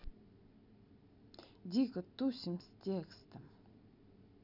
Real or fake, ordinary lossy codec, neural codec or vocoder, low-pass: real; none; none; 5.4 kHz